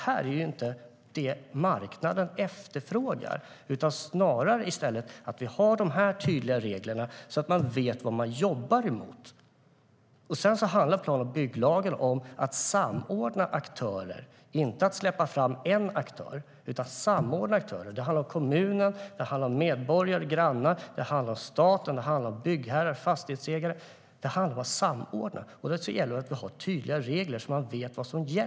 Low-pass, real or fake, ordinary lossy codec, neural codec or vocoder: none; real; none; none